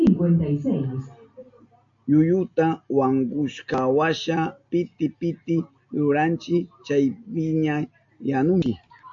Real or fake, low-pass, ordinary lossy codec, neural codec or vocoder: real; 7.2 kHz; MP3, 48 kbps; none